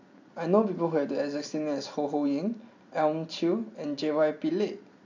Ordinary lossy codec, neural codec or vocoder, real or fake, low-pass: AAC, 48 kbps; none; real; 7.2 kHz